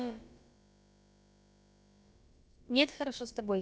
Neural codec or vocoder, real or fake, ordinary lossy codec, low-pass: codec, 16 kHz, about 1 kbps, DyCAST, with the encoder's durations; fake; none; none